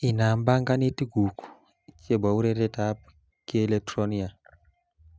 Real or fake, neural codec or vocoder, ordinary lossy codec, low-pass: real; none; none; none